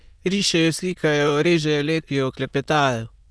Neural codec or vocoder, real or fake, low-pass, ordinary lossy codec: autoencoder, 22.05 kHz, a latent of 192 numbers a frame, VITS, trained on many speakers; fake; none; none